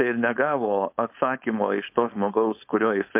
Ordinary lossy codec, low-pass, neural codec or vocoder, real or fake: MP3, 32 kbps; 3.6 kHz; codec, 16 kHz, 4.8 kbps, FACodec; fake